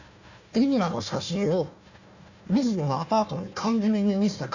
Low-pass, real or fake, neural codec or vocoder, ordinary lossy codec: 7.2 kHz; fake; codec, 16 kHz, 1 kbps, FunCodec, trained on Chinese and English, 50 frames a second; none